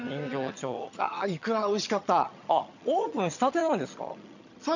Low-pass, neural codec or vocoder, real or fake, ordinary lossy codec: 7.2 kHz; vocoder, 22.05 kHz, 80 mel bands, HiFi-GAN; fake; none